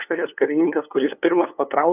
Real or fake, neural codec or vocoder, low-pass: fake; codec, 16 kHz, 2 kbps, FunCodec, trained on LibriTTS, 25 frames a second; 3.6 kHz